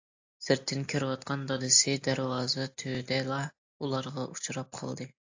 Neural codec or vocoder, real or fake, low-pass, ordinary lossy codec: none; real; 7.2 kHz; AAC, 48 kbps